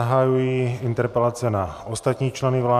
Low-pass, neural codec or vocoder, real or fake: 14.4 kHz; none; real